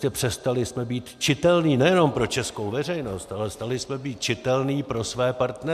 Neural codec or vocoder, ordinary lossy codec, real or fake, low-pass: none; MP3, 96 kbps; real; 14.4 kHz